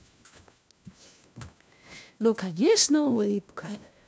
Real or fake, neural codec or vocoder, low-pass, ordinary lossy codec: fake; codec, 16 kHz, 1 kbps, FunCodec, trained on LibriTTS, 50 frames a second; none; none